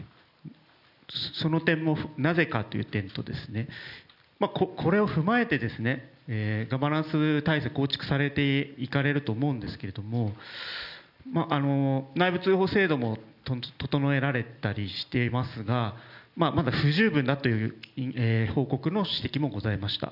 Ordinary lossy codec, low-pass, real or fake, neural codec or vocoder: none; 5.4 kHz; real; none